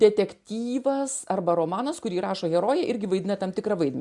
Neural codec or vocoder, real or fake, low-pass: none; real; 10.8 kHz